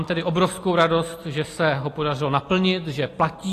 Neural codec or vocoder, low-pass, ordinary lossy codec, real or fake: none; 14.4 kHz; AAC, 48 kbps; real